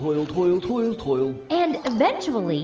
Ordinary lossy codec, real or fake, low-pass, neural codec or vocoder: Opus, 24 kbps; real; 7.2 kHz; none